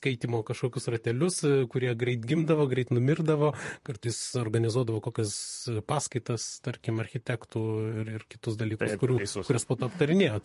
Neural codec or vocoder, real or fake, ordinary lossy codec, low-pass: vocoder, 44.1 kHz, 128 mel bands, Pupu-Vocoder; fake; MP3, 48 kbps; 14.4 kHz